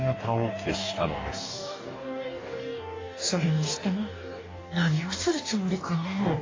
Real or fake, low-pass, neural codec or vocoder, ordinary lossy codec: fake; 7.2 kHz; codec, 44.1 kHz, 2.6 kbps, DAC; AAC, 32 kbps